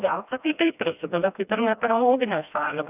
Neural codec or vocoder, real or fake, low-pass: codec, 16 kHz, 1 kbps, FreqCodec, smaller model; fake; 3.6 kHz